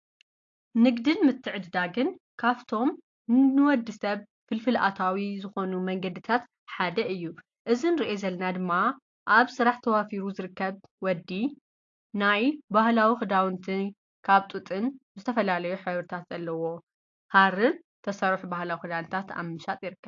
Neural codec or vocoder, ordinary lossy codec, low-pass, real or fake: none; AAC, 48 kbps; 7.2 kHz; real